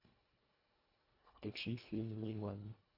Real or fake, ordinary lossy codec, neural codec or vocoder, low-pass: fake; AAC, 48 kbps; codec, 24 kHz, 1.5 kbps, HILCodec; 5.4 kHz